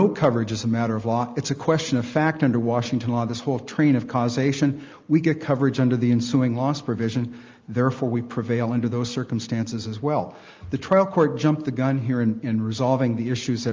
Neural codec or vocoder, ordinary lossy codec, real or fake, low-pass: none; Opus, 32 kbps; real; 7.2 kHz